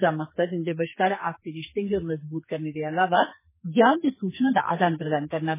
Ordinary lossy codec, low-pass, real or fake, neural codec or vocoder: MP3, 16 kbps; 3.6 kHz; fake; codec, 44.1 kHz, 2.6 kbps, SNAC